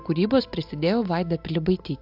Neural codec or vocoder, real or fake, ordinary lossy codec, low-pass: none; real; AAC, 48 kbps; 5.4 kHz